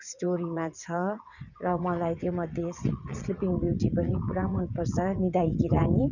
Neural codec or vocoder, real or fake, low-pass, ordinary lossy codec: vocoder, 22.05 kHz, 80 mel bands, WaveNeXt; fake; 7.2 kHz; none